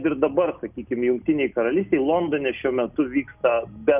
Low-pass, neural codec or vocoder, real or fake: 3.6 kHz; none; real